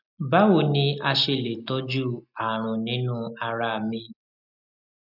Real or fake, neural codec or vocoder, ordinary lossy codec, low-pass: real; none; none; 5.4 kHz